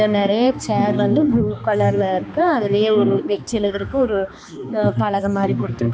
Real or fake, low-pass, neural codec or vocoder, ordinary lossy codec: fake; none; codec, 16 kHz, 2 kbps, X-Codec, HuBERT features, trained on balanced general audio; none